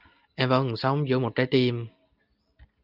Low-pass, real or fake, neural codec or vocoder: 5.4 kHz; real; none